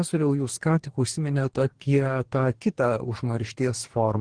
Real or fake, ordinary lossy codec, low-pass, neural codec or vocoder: fake; Opus, 16 kbps; 9.9 kHz; codec, 44.1 kHz, 2.6 kbps, DAC